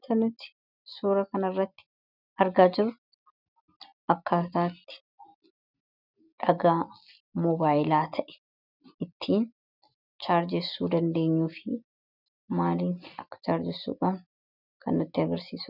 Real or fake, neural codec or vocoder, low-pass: real; none; 5.4 kHz